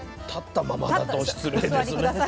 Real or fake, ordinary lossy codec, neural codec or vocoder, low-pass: real; none; none; none